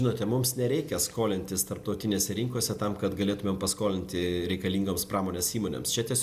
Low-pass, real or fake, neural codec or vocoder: 14.4 kHz; real; none